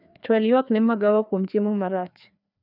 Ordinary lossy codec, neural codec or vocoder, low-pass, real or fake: none; codec, 16 kHz, 2 kbps, FreqCodec, larger model; 5.4 kHz; fake